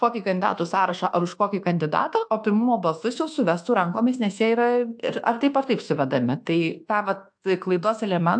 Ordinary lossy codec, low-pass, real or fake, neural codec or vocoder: AAC, 64 kbps; 9.9 kHz; fake; codec, 24 kHz, 1.2 kbps, DualCodec